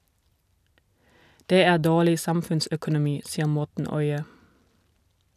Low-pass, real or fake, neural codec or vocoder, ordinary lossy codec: 14.4 kHz; real; none; none